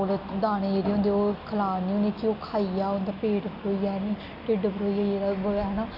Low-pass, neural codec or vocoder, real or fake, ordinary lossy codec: 5.4 kHz; none; real; none